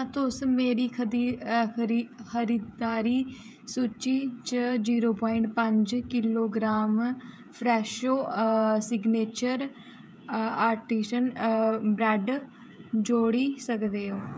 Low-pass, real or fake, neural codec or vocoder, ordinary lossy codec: none; fake; codec, 16 kHz, 16 kbps, FreqCodec, smaller model; none